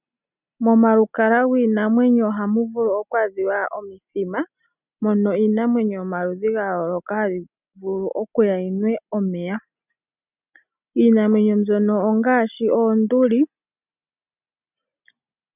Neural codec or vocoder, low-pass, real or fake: none; 3.6 kHz; real